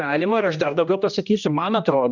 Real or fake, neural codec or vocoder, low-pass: fake; codec, 16 kHz, 1 kbps, X-Codec, HuBERT features, trained on general audio; 7.2 kHz